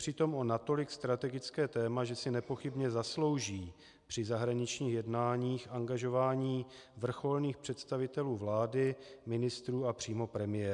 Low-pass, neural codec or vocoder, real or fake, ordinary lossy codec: 10.8 kHz; none; real; MP3, 96 kbps